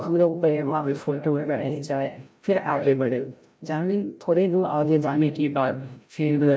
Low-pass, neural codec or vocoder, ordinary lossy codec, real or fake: none; codec, 16 kHz, 0.5 kbps, FreqCodec, larger model; none; fake